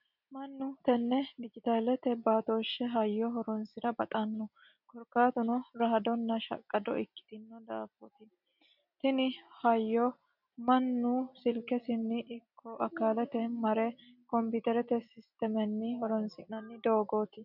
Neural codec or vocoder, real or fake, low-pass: none; real; 5.4 kHz